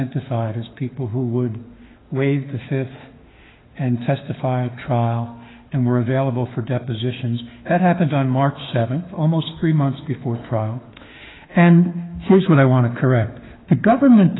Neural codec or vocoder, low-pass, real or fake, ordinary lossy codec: autoencoder, 48 kHz, 32 numbers a frame, DAC-VAE, trained on Japanese speech; 7.2 kHz; fake; AAC, 16 kbps